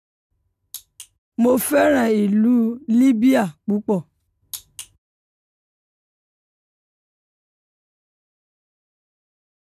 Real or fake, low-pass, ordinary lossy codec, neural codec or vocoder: real; 14.4 kHz; none; none